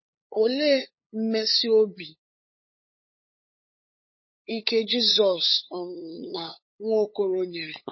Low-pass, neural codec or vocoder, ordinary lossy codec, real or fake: 7.2 kHz; codec, 16 kHz, 8 kbps, FunCodec, trained on LibriTTS, 25 frames a second; MP3, 24 kbps; fake